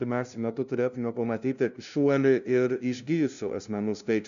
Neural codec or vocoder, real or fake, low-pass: codec, 16 kHz, 0.5 kbps, FunCodec, trained on LibriTTS, 25 frames a second; fake; 7.2 kHz